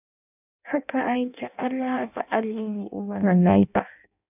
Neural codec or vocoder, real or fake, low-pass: codec, 16 kHz in and 24 kHz out, 0.6 kbps, FireRedTTS-2 codec; fake; 3.6 kHz